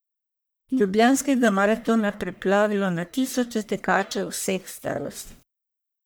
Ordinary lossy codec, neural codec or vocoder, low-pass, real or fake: none; codec, 44.1 kHz, 1.7 kbps, Pupu-Codec; none; fake